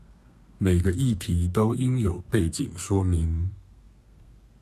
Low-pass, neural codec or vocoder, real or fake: 14.4 kHz; codec, 32 kHz, 1.9 kbps, SNAC; fake